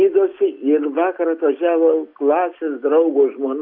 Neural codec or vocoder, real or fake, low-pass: none; real; 5.4 kHz